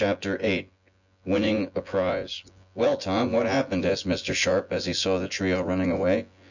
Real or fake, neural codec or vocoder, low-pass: fake; vocoder, 24 kHz, 100 mel bands, Vocos; 7.2 kHz